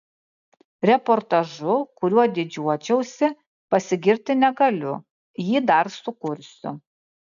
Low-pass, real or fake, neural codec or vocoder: 7.2 kHz; real; none